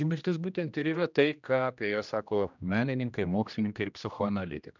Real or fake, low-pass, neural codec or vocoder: fake; 7.2 kHz; codec, 16 kHz, 1 kbps, X-Codec, HuBERT features, trained on general audio